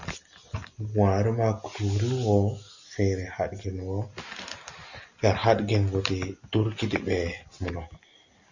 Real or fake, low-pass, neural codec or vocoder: real; 7.2 kHz; none